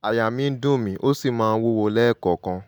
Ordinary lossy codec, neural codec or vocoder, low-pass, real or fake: none; none; 19.8 kHz; real